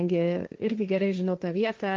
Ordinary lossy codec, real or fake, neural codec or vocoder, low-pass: Opus, 24 kbps; fake; codec, 16 kHz, 1.1 kbps, Voila-Tokenizer; 7.2 kHz